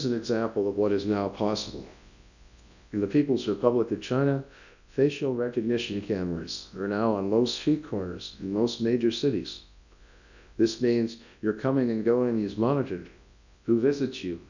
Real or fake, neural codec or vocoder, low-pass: fake; codec, 24 kHz, 0.9 kbps, WavTokenizer, large speech release; 7.2 kHz